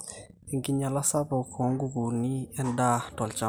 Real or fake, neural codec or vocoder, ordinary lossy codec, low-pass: real; none; none; none